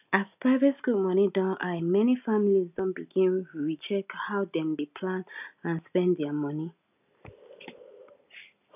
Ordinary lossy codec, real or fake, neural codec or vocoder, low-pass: none; real; none; 3.6 kHz